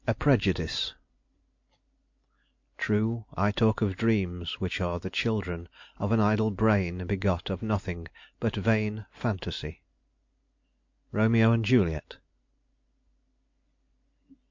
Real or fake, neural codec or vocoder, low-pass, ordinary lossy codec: real; none; 7.2 kHz; MP3, 48 kbps